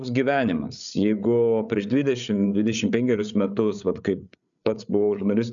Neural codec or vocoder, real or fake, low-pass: codec, 16 kHz, 8 kbps, FreqCodec, larger model; fake; 7.2 kHz